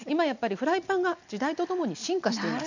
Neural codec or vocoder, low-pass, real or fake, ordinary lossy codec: none; 7.2 kHz; real; none